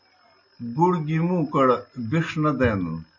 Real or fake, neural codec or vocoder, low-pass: real; none; 7.2 kHz